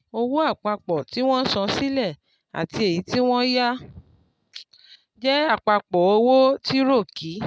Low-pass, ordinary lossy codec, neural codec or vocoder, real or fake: none; none; none; real